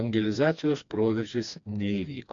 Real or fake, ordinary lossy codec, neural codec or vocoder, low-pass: fake; MP3, 64 kbps; codec, 16 kHz, 2 kbps, FreqCodec, smaller model; 7.2 kHz